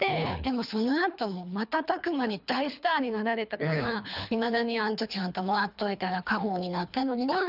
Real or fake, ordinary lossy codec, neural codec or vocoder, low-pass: fake; none; codec, 24 kHz, 3 kbps, HILCodec; 5.4 kHz